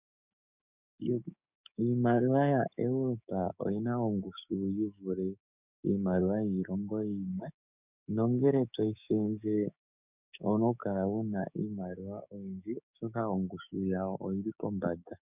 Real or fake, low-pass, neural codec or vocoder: fake; 3.6 kHz; codec, 16 kHz, 6 kbps, DAC